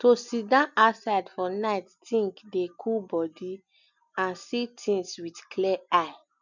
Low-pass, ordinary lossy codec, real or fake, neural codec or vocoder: 7.2 kHz; none; real; none